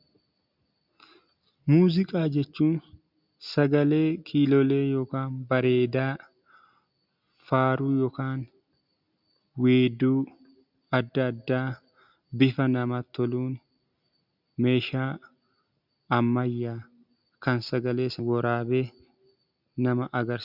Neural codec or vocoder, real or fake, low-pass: none; real; 5.4 kHz